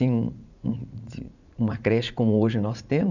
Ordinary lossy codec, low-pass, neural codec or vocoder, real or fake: none; 7.2 kHz; vocoder, 44.1 kHz, 80 mel bands, Vocos; fake